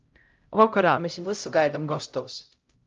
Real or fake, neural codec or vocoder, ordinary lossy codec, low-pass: fake; codec, 16 kHz, 0.5 kbps, X-Codec, HuBERT features, trained on LibriSpeech; Opus, 32 kbps; 7.2 kHz